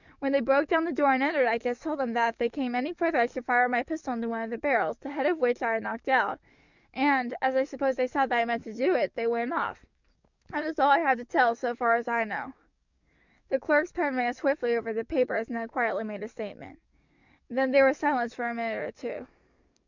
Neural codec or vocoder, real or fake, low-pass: codec, 44.1 kHz, 7.8 kbps, DAC; fake; 7.2 kHz